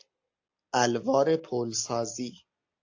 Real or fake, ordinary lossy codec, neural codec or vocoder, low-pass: real; AAC, 48 kbps; none; 7.2 kHz